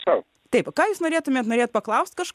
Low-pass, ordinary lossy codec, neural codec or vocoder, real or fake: 14.4 kHz; MP3, 96 kbps; none; real